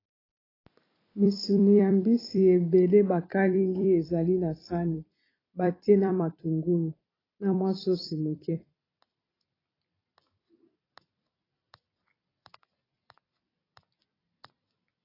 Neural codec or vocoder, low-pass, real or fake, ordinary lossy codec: vocoder, 44.1 kHz, 128 mel bands every 256 samples, BigVGAN v2; 5.4 kHz; fake; AAC, 24 kbps